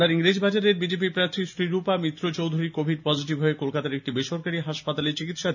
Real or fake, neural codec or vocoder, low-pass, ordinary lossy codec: real; none; 7.2 kHz; none